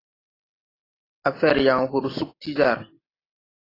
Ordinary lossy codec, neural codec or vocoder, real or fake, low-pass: AAC, 24 kbps; none; real; 5.4 kHz